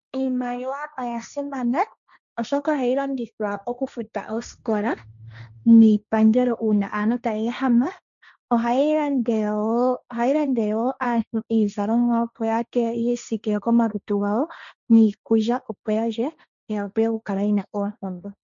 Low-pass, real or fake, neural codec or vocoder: 7.2 kHz; fake; codec, 16 kHz, 1.1 kbps, Voila-Tokenizer